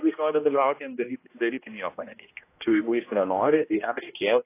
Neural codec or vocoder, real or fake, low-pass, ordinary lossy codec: codec, 16 kHz, 1 kbps, X-Codec, HuBERT features, trained on general audio; fake; 3.6 kHz; AAC, 24 kbps